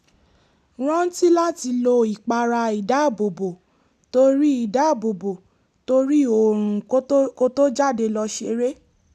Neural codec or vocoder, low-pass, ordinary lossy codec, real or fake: none; 14.4 kHz; none; real